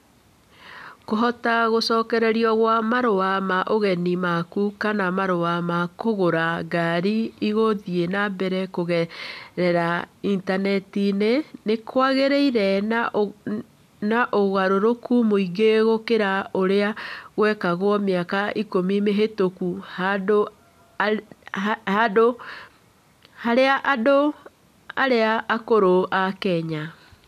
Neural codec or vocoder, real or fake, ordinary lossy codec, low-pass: none; real; none; 14.4 kHz